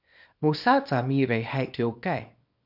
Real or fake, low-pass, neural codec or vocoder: fake; 5.4 kHz; codec, 24 kHz, 0.9 kbps, WavTokenizer, small release